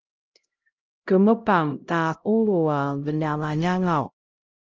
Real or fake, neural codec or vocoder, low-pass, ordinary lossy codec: fake; codec, 16 kHz, 0.5 kbps, X-Codec, HuBERT features, trained on LibriSpeech; 7.2 kHz; Opus, 24 kbps